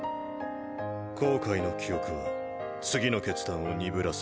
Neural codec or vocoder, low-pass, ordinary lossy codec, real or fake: none; none; none; real